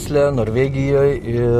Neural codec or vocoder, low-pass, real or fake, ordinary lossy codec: none; 14.4 kHz; real; MP3, 64 kbps